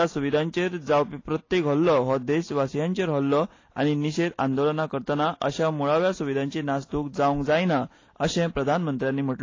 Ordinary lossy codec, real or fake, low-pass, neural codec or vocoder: AAC, 32 kbps; real; 7.2 kHz; none